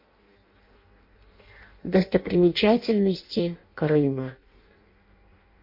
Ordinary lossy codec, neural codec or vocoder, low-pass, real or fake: MP3, 32 kbps; codec, 16 kHz in and 24 kHz out, 0.6 kbps, FireRedTTS-2 codec; 5.4 kHz; fake